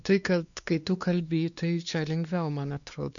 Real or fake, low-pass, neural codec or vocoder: fake; 7.2 kHz; codec, 16 kHz, 2 kbps, X-Codec, WavLM features, trained on Multilingual LibriSpeech